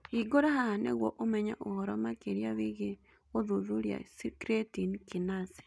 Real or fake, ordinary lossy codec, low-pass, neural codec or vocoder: real; none; none; none